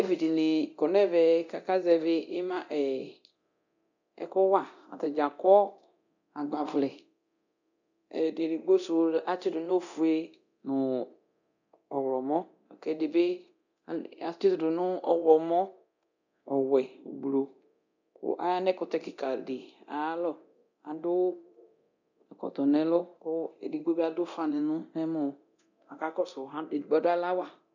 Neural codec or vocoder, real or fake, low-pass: codec, 24 kHz, 0.9 kbps, DualCodec; fake; 7.2 kHz